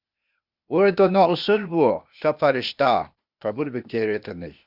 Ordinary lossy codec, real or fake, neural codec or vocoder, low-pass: Opus, 64 kbps; fake; codec, 16 kHz, 0.8 kbps, ZipCodec; 5.4 kHz